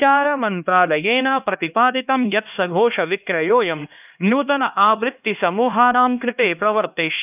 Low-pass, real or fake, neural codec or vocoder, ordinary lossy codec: 3.6 kHz; fake; codec, 16 kHz, 1 kbps, X-Codec, HuBERT features, trained on LibriSpeech; none